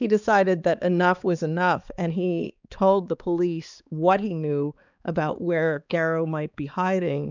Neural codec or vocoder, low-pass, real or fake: codec, 16 kHz, 4 kbps, X-Codec, HuBERT features, trained on balanced general audio; 7.2 kHz; fake